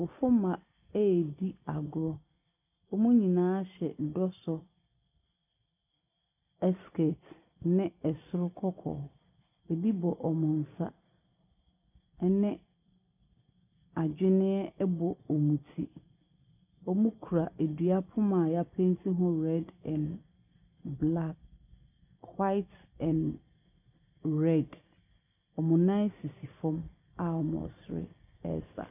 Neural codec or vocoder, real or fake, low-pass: none; real; 3.6 kHz